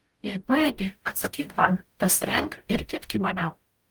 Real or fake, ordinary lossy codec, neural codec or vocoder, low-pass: fake; Opus, 32 kbps; codec, 44.1 kHz, 0.9 kbps, DAC; 19.8 kHz